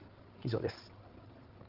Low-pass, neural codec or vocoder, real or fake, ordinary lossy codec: 5.4 kHz; codec, 16 kHz, 16 kbps, FreqCodec, larger model; fake; Opus, 16 kbps